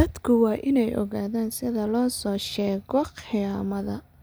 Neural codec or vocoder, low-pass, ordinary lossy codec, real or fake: none; none; none; real